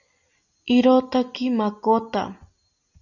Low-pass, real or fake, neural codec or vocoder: 7.2 kHz; real; none